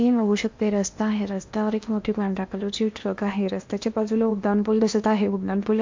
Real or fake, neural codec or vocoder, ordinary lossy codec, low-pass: fake; codec, 16 kHz in and 24 kHz out, 0.8 kbps, FocalCodec, streaming, 65536 codes; MP3, 48 kbps; 7.2 kHz